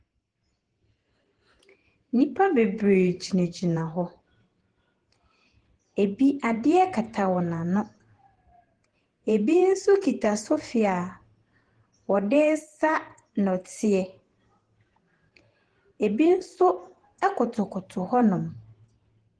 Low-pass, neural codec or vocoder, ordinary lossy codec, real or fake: 9.9 kHz; vocoder, 48 kHz, 128 mel bands, Vocos; Opus, 16 kbps; fake